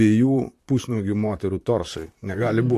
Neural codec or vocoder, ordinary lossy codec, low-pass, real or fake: vocoder, 44.1 kHz, 128 mel bands, Pupu-Vocoder; AAC, 64 kbps; 14.4 kHz; fake